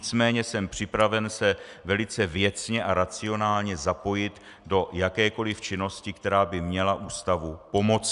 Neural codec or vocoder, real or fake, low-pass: none; real; 10.8 kHz